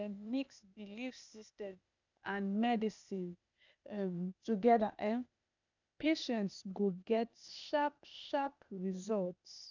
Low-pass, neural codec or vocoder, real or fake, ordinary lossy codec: 7.2 kHz; codec, 16 kHz, 0.8 kbps, ZipCodec; fake; none